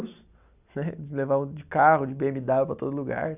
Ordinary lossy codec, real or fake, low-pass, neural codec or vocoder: none; real; 3.6 kHz; none